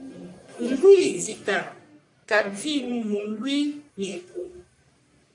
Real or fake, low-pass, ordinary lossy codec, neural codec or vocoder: fake; 10.8 kHz; AAC, 64 kbps; codec, 44.1 kHz, 1.7 kbps, Pupu-Codec